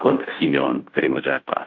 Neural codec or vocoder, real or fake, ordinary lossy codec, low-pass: codec, 16 kHz, 0.5 kbps, FunCodec, trained on Chinese and English, 25 frames a second; fake; AAC, 48 kbps; 7.2 kHz